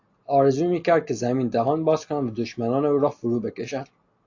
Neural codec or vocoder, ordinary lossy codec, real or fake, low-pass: none; AAC, 48 kbps; real; 7.2 kHz